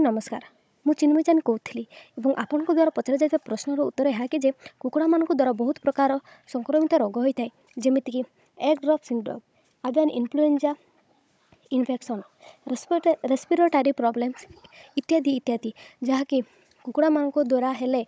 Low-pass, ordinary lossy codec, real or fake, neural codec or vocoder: none; none; fake; codec, 16 kHz, 16 kbps, FunCodec, trained on Chinese and English, 50 frames a second